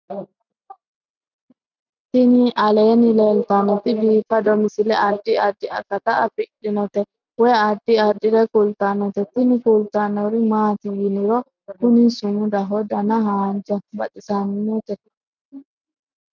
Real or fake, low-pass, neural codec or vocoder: real; 7.2 kHz; none